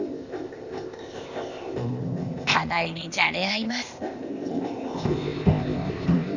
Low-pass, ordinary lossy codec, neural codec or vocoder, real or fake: 7.2 kHz; none; codec, 16 kHz, 0.8 kbps, ZipCodec; fake